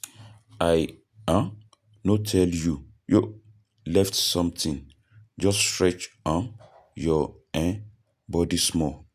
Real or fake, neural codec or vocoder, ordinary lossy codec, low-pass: real; none; none; 14.4 kHz